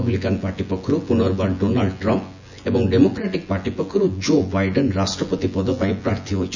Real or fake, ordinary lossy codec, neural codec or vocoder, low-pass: fake; none; vocoder, 24 kHz, 100 mel bands, Vocos; 7.2 kHz